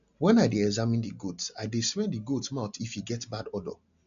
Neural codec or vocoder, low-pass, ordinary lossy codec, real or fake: none; 7.2 kHz; none; real